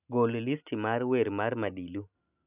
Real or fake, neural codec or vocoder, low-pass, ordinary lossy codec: real; none; 3.6 kHz; none